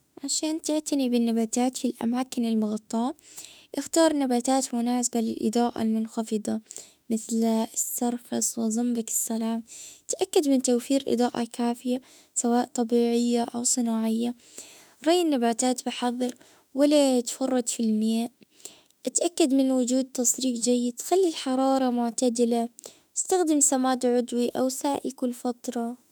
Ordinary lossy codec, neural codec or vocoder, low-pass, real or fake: none; autoencoder, 48 kHz, 32 numbers a frame, DAC-VAE, trained on Japanese speech; none; fake